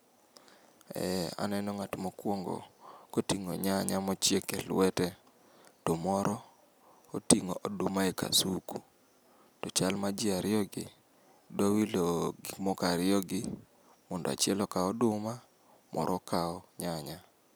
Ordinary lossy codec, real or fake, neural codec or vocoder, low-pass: none; real; none; none